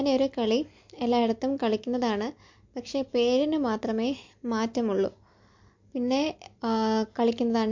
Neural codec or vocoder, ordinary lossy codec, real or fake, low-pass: none; MP3, 48 kbps; real; 7.2 kHz